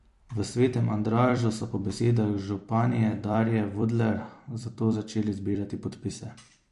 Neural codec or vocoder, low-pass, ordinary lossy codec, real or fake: vocoder, 44.1 kHz, 128 mel bands every 256 samples, BigVGAN v2; 14.4 kHz; MP3, 48 kbps; fake